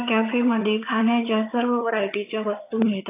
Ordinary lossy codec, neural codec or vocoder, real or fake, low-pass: AAC, 24 kbps; vocoder, 22.05 kHz, 80 mel bands, HiFi-GAN; fake; 3.6 kHz